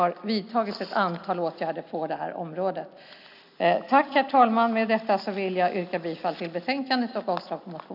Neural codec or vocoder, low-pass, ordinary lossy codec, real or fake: none; 5.4 kHz; none; real